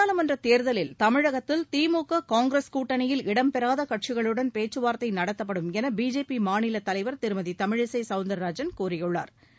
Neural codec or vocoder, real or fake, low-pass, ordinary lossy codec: none; real; none; none